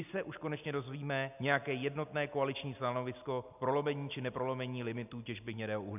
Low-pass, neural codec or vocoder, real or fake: 3.6 kHz; none; real